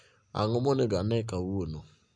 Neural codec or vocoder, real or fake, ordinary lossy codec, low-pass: none; real; none; 9.9 kHz